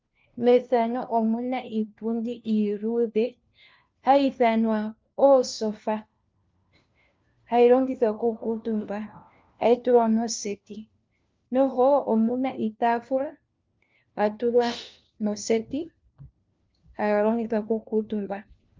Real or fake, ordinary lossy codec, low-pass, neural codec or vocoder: fake; Opus, 32 kbps; 7.2 kHz; codec, 16 kHz, 1 kbps, FunCodec, trained on LibriTTS, 50 frames a second